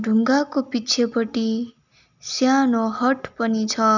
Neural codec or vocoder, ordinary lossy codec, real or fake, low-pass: none; none; real; 7.2 kHz